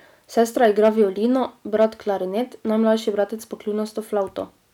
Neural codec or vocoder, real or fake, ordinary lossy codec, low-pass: none; real; none; 19.8 kHz